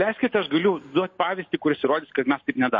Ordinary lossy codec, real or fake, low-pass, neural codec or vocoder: MP3, 32 kbps; real; 7.2 kHz; none